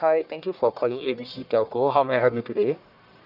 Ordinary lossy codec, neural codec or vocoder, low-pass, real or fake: none; codec, 44.1 kHz, 1.7 kbps, Pupu-Codec; 5.4 kHz; fake